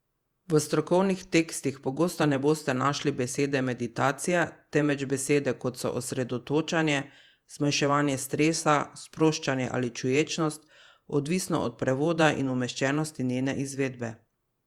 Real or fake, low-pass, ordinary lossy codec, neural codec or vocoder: fake; 19.8 kHz; Opus, 64 kbps; vocoder, 48 kHz, 128 mel bands, Vocos